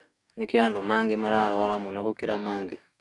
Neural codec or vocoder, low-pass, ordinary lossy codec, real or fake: codec, 44.1 kHz, 2.6 kbps, DAC; 10.8 kHz; none; fake